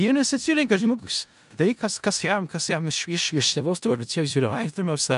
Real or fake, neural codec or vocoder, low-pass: fake; codec, 16 kHz in and 24 kHz out, 0.4 kbps, LongCat-Audio-Codec, four codebook decoder; 10.8 kHz